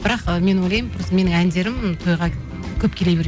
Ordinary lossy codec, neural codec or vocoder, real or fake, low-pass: none; none; real; none